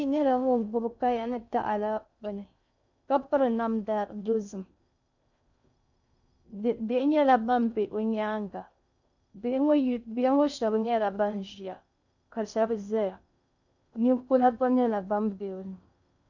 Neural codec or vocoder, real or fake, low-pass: codec, 16 kHz in and 24 kHz out, 0.6 kbps, FocalCodec, streaming, 2048 codes; fake; 7.2 kHz